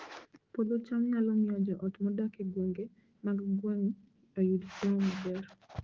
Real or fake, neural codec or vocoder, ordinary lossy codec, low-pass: fake; autoencoder, 48 kHz, 128 numbers a frame, DAC-VAE, trained on Japanese speech; Opus, 16 kbps; 7.2 kHz